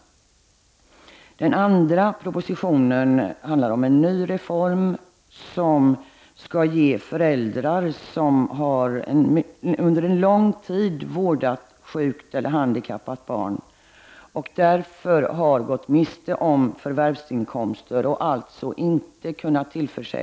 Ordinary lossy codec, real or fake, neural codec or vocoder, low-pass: none; real; none; none